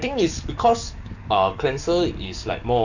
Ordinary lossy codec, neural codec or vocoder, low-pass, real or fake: none; codec, 44.1 kHz, 7.8 kbps, DAC; 7.2 kHz; fake